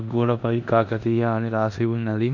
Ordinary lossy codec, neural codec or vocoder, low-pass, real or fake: none; codec, 16 kHz in and 24 kHz out, 0.9 kbps, LongCat-Audio-Codec, four codebook decoder; 7.2 kHz; fake